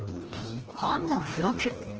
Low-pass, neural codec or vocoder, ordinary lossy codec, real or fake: 7.2 kHz; codec, 16 kHz, 1 kbps, FreqCodec, larger model; Opus, 16 kbps; fake